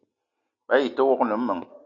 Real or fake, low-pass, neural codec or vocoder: real; 7.2 kHz; none